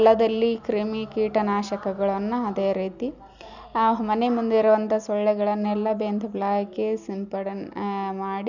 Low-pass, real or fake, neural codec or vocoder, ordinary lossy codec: 7.2 kHz; real; none; none